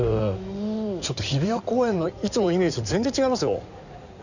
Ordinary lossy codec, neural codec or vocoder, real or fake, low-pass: none; codec, 16 kHz in and 24 kHz out, 2.2 kbps, FireRedTTS-2 codec; fake; 7.2 kHz